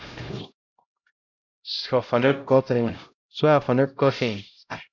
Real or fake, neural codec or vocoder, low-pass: fake; codec, 16 kHz, 0.5 kbps, X-Codec, HuBERT features, trained on LibriSpeech; 7.2 kHz